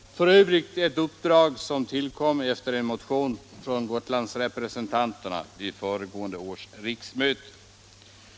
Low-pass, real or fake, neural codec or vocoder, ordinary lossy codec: none; real; none; none